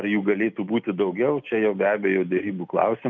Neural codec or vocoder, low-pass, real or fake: none; 7.2 kHz; real